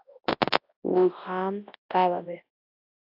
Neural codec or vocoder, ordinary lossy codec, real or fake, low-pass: codec, 24 kHz, 0.9 kbps, WavTokenizer, large speech release; AAC, 32 kbps; fake; 5.4 kHz